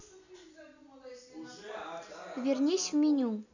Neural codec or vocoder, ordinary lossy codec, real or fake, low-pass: none; none; real; 7.2 kHz